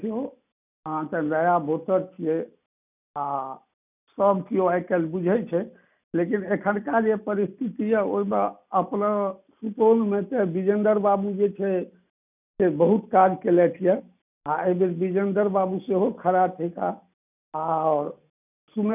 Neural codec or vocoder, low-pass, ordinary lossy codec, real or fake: none; 3.6 kHz; none; real